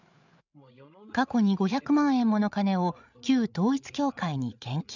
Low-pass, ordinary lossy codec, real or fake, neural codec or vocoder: 7.2 kHz; none; fake; codec, 16 kHz, 8 kbps, FreqCodec, larger model